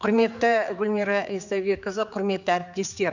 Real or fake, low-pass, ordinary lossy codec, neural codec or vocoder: fake; 7.2 kHz; none; codec, 16 kHz, 4 kbps, X-Codec, HuBERT features, trained on general audio